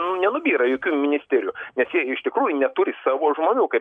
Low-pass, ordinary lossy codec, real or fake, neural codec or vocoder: 9.9 kHz; MP3, 96 kbps; real; none